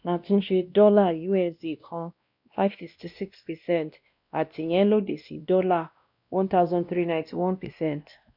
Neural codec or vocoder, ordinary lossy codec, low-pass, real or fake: codec, 16 kHz, 1 kbps, X-Codec, WavLM features, trained on Multilingual LibriSpeech; none; 5.4 kHz; fake